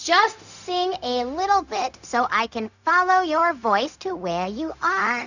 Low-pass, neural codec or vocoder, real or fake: 7.2 kHz; codec, 16 kHz, 0.4 kbps, LongCat-Audio-Codec; fake